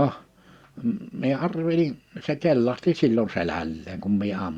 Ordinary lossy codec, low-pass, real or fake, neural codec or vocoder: Opus, 64 kbps; 19.8 kHz; real; none